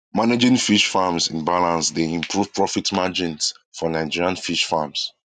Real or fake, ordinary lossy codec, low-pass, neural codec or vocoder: real; none; 10.8 kHz; none